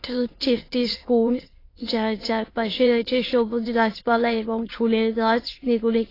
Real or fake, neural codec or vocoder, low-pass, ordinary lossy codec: fake; autoencoder, 22.05 kHz, a latent of 192 numbers a frame, VITS, trained on many speakers; 5.4 kHz; AAC, 24 kbps